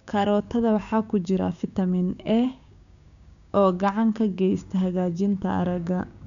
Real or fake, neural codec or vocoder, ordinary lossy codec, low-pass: fake; codec, 16 kHz, 6 kbps, DAC; none; 7.2 kHz